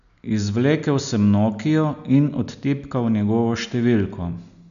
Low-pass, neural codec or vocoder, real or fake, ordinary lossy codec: 7.2 kHz; none; real; none